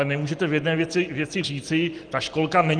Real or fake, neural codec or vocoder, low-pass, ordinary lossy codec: real; none; 9.9 kHz; Opus, 24 kbps